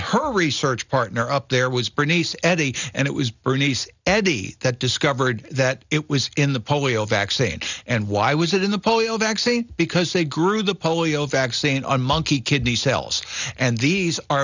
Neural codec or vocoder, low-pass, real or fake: none; 7.2 kHz; real